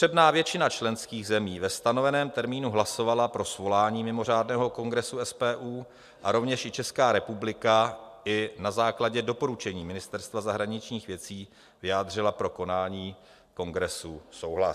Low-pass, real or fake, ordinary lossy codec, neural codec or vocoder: 14.4 kHz; real; MP3, 96 kbps; none